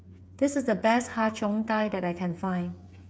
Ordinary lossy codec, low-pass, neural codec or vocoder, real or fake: none; none; codec, 16 kHz, 8 kbps, FreqCodec, smaller model; fake